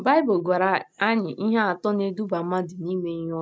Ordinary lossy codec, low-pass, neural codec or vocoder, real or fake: none; none; none; real